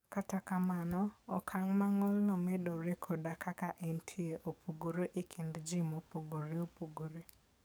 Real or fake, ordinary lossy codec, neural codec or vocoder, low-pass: fake; none; codec, 44.1 kHz, 7.8 kbps, DAC; none